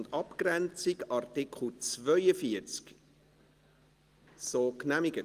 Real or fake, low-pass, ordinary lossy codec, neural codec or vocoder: real; 14.4 kHz; Opus, 24 kbps; none